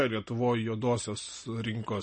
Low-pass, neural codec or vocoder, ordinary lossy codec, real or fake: 10.8 kHz; none; MP3, 32 kbps; real